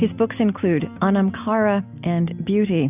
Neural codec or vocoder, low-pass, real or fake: none; 3.6 kHz; real